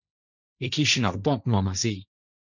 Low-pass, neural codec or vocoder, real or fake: 7.2 kHz; codec, 16 kHz, 1.1 kbps, Voila-Tokenizer; fake